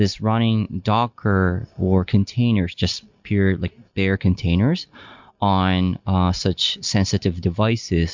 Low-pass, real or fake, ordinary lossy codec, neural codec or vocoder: 7.2 kHz; real; MP3, 64 kbps; none